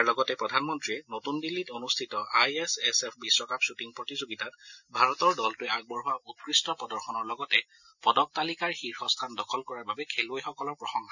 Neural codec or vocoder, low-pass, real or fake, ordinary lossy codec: none; 7.2 kHz; real; none